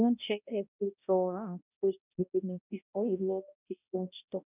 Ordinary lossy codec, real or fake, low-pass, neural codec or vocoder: none; fake; 3.6 kHz; codec, 16 kHz, 0.5 kbps, X-Codec, HuBERT features, trained on balanced general audio